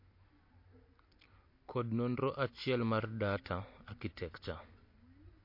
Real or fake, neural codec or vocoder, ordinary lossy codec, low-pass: real; none; MP3, 32 kbps; 5.4 kHz